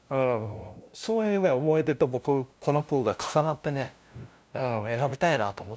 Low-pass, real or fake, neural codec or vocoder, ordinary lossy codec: none; fake; codec, 16 kHz, 0.5 kbps, FunCodec, trained on LibriTTS, 25 frames a second; none